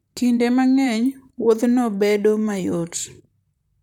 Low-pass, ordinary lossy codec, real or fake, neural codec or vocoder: 19.8 kHz; none; fake; vocoder, 44.1 kHz, 128 mel bands, Pupu-Vocoder